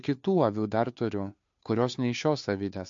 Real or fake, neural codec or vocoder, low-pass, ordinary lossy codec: fake; codec, 16 kHz, 2 kbps, FunCodec, trained on Chinese and English, 25 frames a second; 7.2 kHz; MP3, 48 kbps